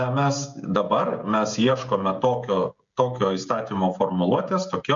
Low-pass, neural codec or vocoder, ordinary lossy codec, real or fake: 7.2 kHz; codec, 16 kHz, 16 kbps, FreqCodec, smaller model; MP3, 64 kbps; fake